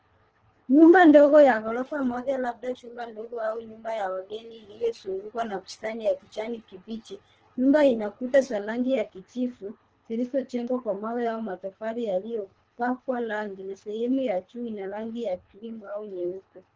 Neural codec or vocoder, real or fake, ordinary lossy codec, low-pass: codec, 24 kHz, 3 kbps, HILCodec; fake; Opus, 16 kbps; 7.2 kHz